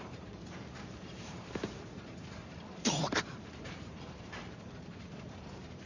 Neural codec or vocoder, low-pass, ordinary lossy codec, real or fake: vocoder, 44.1 kHz, 128 mel bands every 512 samples, BigVGAN v2; 7.2 kHz; none; fake